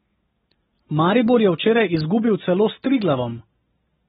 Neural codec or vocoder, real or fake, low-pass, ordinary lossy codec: none; real; 7.2 kHz; AAC, 16 kbps